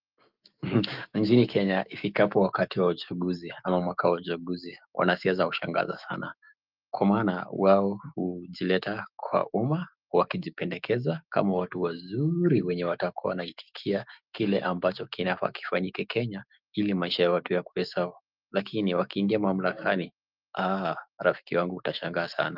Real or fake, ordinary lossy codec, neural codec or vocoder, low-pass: fake; Opus, 24 kbps; autoencoder, 48 kHz, 128 numbers a frame, DAC-VAE, trained on Japanese speech; 5.4 kHz